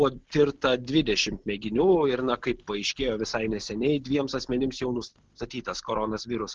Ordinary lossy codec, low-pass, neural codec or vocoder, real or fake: Opus, 16 kbps; 7.2 kHz; none; real